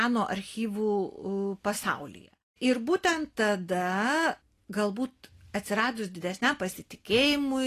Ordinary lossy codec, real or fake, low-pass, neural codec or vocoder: AAC, 48 kbps; real; 14.4 kHz; none